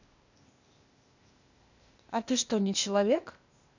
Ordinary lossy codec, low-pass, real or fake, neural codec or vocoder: none; 7.2 kHz; fake; codec, 16 kHz, 0.8 kbps, ZipCodec